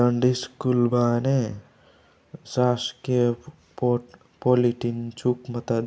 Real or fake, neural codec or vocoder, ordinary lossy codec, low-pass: real; none; none; none